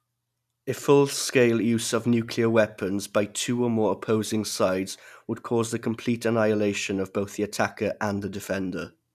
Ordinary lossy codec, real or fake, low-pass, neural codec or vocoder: none; real; 14.4 kHz; none